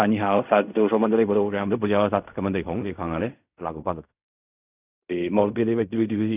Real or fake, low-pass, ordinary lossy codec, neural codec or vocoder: fake; 3.6 kHz; none; codec, 16 kHz in and 24 kHz out, 0.4 kbps, LongCat-Audio-Codec, fine tuned four codebook decoder